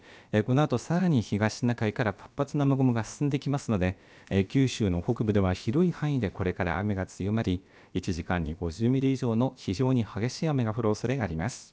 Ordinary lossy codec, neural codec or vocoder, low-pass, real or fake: none; codec, 16 kHz, about 1 kbps, DyCAST, with the encoder's durations; none; fake